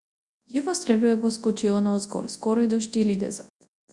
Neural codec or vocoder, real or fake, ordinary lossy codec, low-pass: codec, 24 kHz, 0.9 kbps, WavTokenizer, large speech release; fake; Opus, 64 kbps; 10.8 kHz